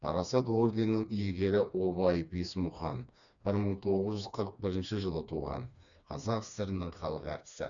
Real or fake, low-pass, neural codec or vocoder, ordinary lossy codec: fake; 7.2 kHz; codec, 16 kHz, 2 kbps, FreqCodec, smaller model; none